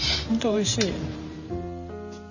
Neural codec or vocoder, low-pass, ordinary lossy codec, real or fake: vocoder, 44.1 kHz, 128 mel bands every 512 samples, BigVGAN v2; 7.2 kHz; none; fake